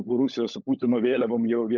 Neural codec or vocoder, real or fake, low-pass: codec, 16 kHz, 16 kbps, FunCodec, trained on LibriTTS, 50 frames a second; fake; 7.2 kHz